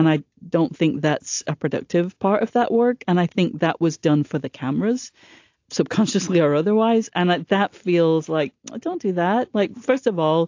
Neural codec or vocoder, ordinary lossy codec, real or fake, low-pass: none; AAC, 48 kbps; real; 7.2 kHz